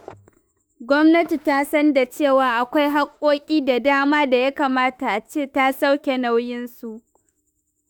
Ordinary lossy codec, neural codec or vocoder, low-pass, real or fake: none; autoencoder, 48 kHz, 32 numbers a frame, DAC-VAE, trained on Japanese speech; none; fake